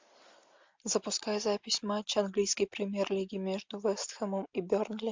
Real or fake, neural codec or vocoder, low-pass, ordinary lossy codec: real; none; 7.2 kHz; MP3, 64 kbps